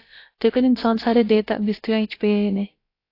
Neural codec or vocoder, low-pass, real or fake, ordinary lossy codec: codec, 16 kHz, about 1 kbps, DyCAST, with the encoder's durations; 5.4 kHz; fake; AAC, 32 kbps